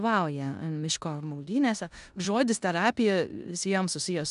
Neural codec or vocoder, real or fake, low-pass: codec, 16 kHz in and 24 kHz out, 0.9 kbps, LongCat-Audio-Codec, four codebook decoder; fake; 10.8 kHz